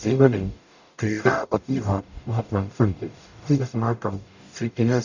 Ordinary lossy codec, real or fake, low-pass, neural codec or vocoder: none; fake; 7.2 kHz; codec, 44.1 kHz, 0.9 kbps, DAC